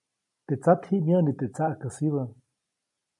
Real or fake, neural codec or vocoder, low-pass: real; none; 10.8 kHz